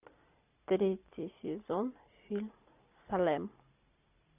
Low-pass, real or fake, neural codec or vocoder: 3.6 kHz; real; none